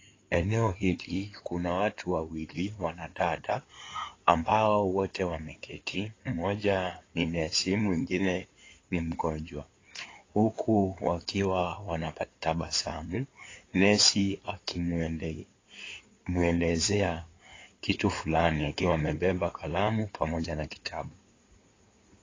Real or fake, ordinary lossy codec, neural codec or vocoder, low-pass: fake; AAC, 32 kbps; codec, 16 kHz in and 24 kHz out, 2.2 kbps, FireRedTTS-2 codec; 7.2 kHz